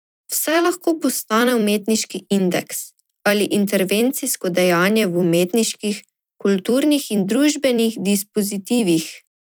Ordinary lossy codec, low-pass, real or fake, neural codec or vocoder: none; none; fake; vocoder, 44.1 kHz, 128 mel bands every 256 samples, BigVGAN v2